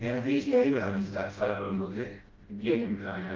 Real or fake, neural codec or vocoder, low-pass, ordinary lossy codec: fake; codec, 16 kHz, 0.5 kbps, FreqCodec, smaller model; 7.2 kHz; Opus, 24 kbps